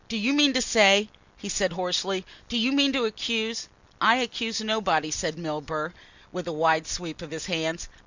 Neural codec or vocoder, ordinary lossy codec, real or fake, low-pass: none; Opus, 64 kbps; real; 7.2 kHz